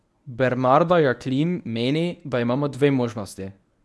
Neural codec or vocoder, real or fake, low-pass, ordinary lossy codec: codec, 24 kHz, 0.9 kbps, WavTokenizer, medium speech release version 1; fake; none; none